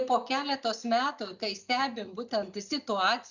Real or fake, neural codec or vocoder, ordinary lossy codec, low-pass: real; none; Opus, 64 kbps; 7.2 kHz